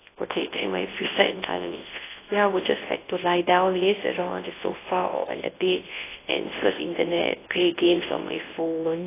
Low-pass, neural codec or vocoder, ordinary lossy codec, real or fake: 3.6 kHz; codec, 24 kHz, 0.9 kbps, WavTokenizer, large speech release; AAC, 16 kbps; fake